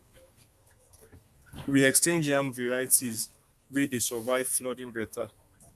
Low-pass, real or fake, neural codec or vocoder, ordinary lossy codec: 14.4 kHz; fake; codec, 32 kHz, 1.9 kbps, SNAC; none